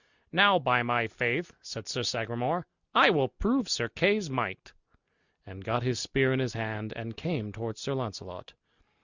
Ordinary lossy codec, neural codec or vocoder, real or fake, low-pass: Opus, 64 kbps; none; real; 7.2 kHz